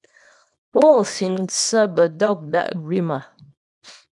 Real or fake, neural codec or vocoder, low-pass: fake; codec, 24 kHz, 0.9 kbps, WavTokenizer, small release; 10.8 kHz